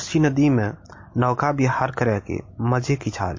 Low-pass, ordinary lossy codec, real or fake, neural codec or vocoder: 7.2 kHz; MP3, 32 kbps; real; none